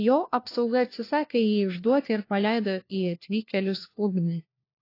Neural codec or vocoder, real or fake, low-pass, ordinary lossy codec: codec, 16 kHz, 1 kbps, FunCodec, trained on LibriTTS, 50 frames a second; fake; 5.4 kHz; AAC, 32 kbps